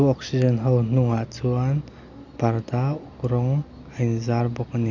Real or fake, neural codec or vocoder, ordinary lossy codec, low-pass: real; none; none; 7.2 kHz